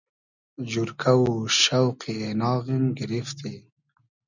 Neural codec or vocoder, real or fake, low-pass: none; real; 7.2 kHz